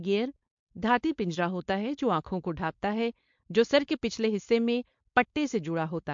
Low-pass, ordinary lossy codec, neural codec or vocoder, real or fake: 7.2 kHz; MP3, 48 kbps; codec, 16 kHz, 4.8 kbps, FACodec; fake